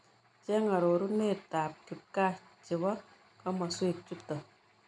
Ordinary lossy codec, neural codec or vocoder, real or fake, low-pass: none; none; real; 9.9 kHz